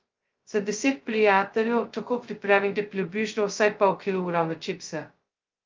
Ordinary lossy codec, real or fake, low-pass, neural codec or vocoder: Opus, 24 kbps; fake; 7.2 kHz; codec, 16 kHz, 0.2 kbps, FocalCodec